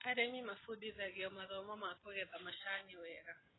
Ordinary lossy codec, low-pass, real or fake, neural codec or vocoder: AAC, 16 kbps; 7.2 kHz; real; none